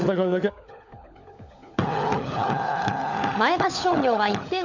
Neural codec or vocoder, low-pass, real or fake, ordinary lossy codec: codec, 16 kHz, 16 kbps, FunCodec, trained on LibriTTS, 50 frames a second; 7.2 kHz; fake; none